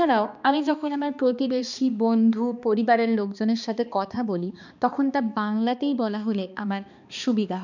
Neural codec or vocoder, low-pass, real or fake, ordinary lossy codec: codec, 16 kHz, 2 kbps, X-Codec, HuBERT features, trained on balanced general audio; 7.2 kHz; fake; none